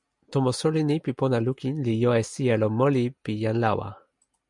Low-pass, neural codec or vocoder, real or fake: 10.8 kHz; none; real